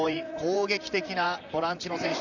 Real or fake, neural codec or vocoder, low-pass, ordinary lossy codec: fake; vocoder, 44.1 kHz, 128 mel bands, Pupu-Vocoder; 7.2 kHz; none